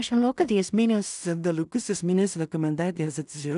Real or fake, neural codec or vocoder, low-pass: fake; codec, 16 kHz in and 24 kHz out, 0.4 kbps, LongCat-Audio-Codec, two codebook decoder; 10.8 kHz